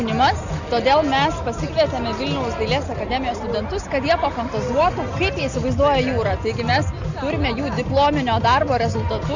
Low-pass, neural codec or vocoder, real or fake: 7.2 kHz; none; real